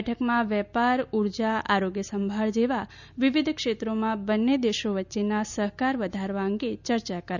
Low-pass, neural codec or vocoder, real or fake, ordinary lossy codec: 7.2 kHz; none; real; none